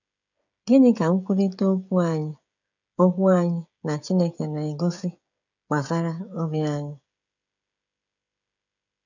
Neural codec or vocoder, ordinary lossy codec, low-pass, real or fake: codec, 16 kHz, 16 kbps, FreqCodec, smaller model; none; 7.2 kHz; fake